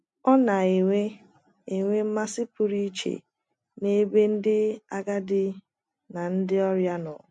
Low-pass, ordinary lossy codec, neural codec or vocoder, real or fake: 10.8 kHz; MP3, 48 kbps; none; real